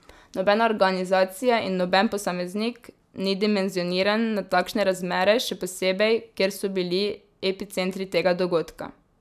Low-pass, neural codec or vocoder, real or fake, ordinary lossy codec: 14.4 kHz; none; real; none